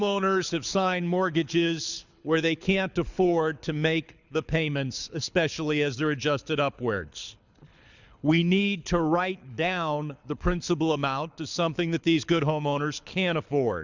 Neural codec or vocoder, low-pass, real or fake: codec, 24 kHz, 6 kbps, HILCodec; 7.2 kHz; fake